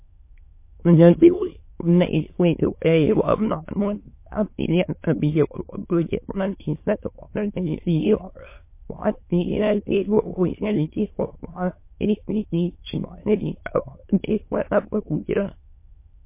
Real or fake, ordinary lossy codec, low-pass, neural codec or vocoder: fake; MP3, 24 kbps; 3.6 kHz; autoencoder, 22.05 kHz, a latent of 192 numbers a frame, VITS, trained on many speakers